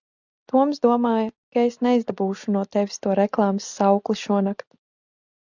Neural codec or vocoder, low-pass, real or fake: none; 7.2 kHz; real